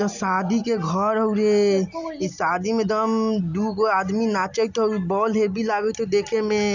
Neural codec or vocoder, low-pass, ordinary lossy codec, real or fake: none; 7.2 kHz; none; real